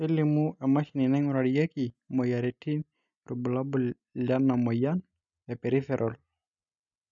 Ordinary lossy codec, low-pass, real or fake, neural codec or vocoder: none; 7.2 kHz; real; none